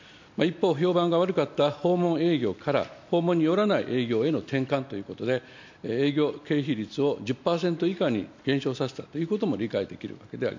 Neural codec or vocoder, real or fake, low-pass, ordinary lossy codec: none; real; 7.2 kHz; MP3, 48 kbps